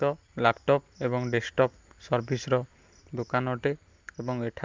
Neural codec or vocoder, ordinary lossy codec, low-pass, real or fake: none; Opus, 24 kbps; 7.2 kHz; real